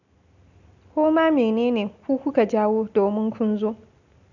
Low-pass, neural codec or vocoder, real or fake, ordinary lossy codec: 7.2 kHz; none; real; none